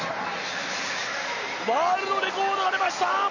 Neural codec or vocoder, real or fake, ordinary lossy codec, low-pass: none; real; none; 7.2 kHz